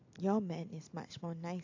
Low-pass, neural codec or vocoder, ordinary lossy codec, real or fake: 7.2 kHz; none; AAC, 48 kbps; real